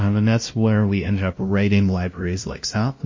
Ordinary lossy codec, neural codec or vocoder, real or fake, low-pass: MP3, 32 kbps; codec, 16 kHz, 0.5 kbps, FunCodec, trained on LibriTTS, 25 frames a second; fake; 7.2 kHz